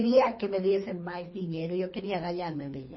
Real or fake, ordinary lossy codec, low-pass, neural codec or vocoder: fake; MP3, 24 kbps; 7.2 kHz; codec, 24 kHz, 3 kbps, HILCodec